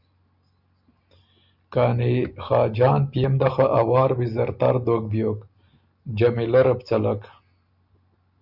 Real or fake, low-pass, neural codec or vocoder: real; 5.4 kHz; none